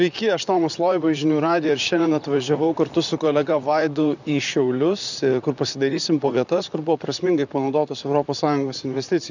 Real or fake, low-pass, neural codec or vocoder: fake; 7.2 kHz; vocoder, 44.1 kHz, 128 mel bands, Pupu-Vocoder